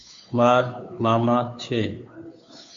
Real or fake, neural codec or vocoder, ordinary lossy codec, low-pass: fake; codec, 16 kHz, 2 kbps, FunCodec, trained on Chinese and English, 25 frames a second; MP3, 48 kbps; 7.2 kHz